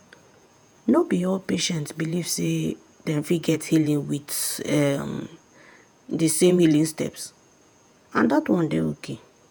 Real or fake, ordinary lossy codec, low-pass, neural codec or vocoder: fake; none; none; vocoder, 48 kHz, 128 mel bands, Vocos